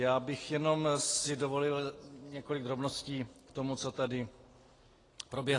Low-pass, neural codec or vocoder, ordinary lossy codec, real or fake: 10.8 kHz; none; AAC, 32 kbps; real